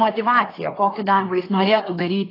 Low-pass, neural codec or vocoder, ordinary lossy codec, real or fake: 5.4 kHz; codec, 24 kHz, 1 kbps, SNAC; AAC, 24 kbps; fake